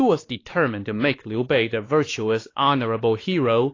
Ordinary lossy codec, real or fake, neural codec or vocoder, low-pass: AAC, 32 kbps; fake; codec, 16 kHz, 2 kbps, X-Codec, WavLM features, trained on Multilingual LibriSpeech; 7.2 kHz